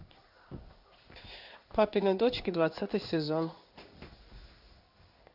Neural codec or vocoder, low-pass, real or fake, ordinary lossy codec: codec, 16 kHz in and 24 kHz out, 1 kbps, XY-Tokenizer; 5.4 kHz; fake; none